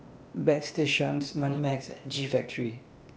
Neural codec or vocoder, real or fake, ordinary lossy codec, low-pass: codec, 16 kHz, 0.8 kbps, ZipCodec; fake; none; none